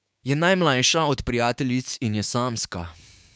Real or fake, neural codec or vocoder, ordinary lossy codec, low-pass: fake; codec, 16 kHz, 6 kbps, DAC; none; none